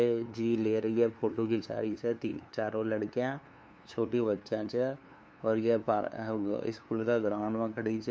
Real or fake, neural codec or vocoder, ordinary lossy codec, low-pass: fake; codec, 16 kHz, 2 kbps, FunCodec, trained on LibriTTS, 25 frames a second; none; none